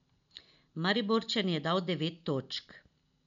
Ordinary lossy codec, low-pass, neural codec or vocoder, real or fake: none; 7.2 kHz; none; real